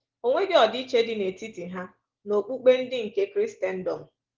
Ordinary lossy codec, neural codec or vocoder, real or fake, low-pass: Opus, 16 kbps; none; real; 7.2 kHz